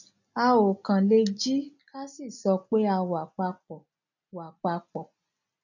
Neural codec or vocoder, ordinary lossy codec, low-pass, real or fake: none; none; 7.2 kHz; real